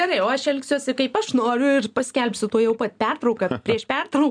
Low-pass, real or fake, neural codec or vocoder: 9.9 kHz; real; none